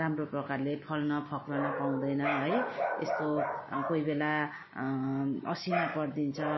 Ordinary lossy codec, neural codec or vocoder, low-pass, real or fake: MP3, 24 kbps; none; 7.2 kHz; real